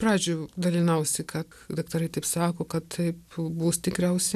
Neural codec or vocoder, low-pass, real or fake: none; 14.4 kHz; real